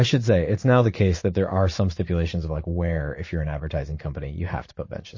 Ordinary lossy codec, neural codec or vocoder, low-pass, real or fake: MP3, 32 kbps; vocoder, 44.1 kHz, 80 mel bands, Vocos; 7.2 kHz; fake